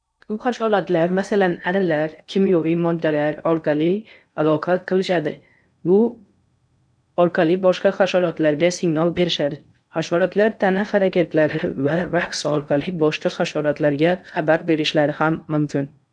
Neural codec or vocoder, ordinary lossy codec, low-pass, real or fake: codec, 16 kHz in and 24 kHz out, 0.6 kbps, FocalCodec, streaming, 2048 codes; none; 9.9 kHz; fake